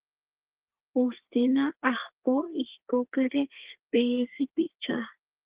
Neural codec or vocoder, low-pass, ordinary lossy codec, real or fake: codec, 32 kHz, 1.9 kbps, SNAC; 3.6 kHz; Opus, 16 kbps; fake